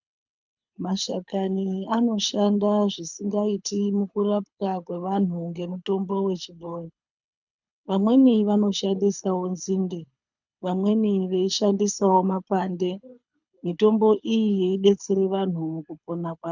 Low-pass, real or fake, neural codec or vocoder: 7.2 kHz; fake; codec, 24 kHz, 6 kbps, HILCodec